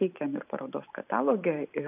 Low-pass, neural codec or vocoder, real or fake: 3.6 kHz; vocoder, 44.1 kHz, 128 mel bands every 512 samples, BigVGAN v2; fake